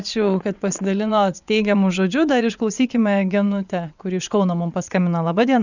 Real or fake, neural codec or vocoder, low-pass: fake; vocoder, 22.05 kHz, 80 mel bands, WaveNeXt; 7.2 kHz